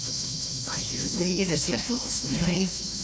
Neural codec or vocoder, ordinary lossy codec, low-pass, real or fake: codec, 16 kHz, 1 kbps, FunCodec, trained on Chinese and English, 50 frames a second; none; none; fake